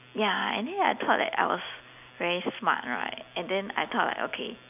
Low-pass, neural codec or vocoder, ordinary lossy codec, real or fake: 3.6 kHz; none; none; real